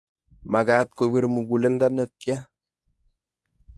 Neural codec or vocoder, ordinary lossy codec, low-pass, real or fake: codec, 24 kHz, 0.9 kbps, WavTokenizer, medium speech release version 1; none; none; fake